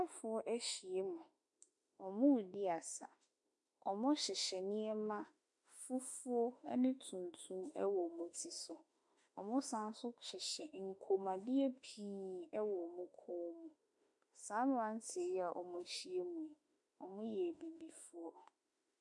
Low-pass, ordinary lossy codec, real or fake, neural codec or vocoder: 10.8 kHz; MP3, 64 kbps; fake; autoencoder, 48 kHz, 32 numbers a frame, DAC-VAE, trained on Japanese speech